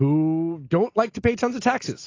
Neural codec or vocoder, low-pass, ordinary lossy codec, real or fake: none; 7.2 kHz; AAC, 32 kbps; real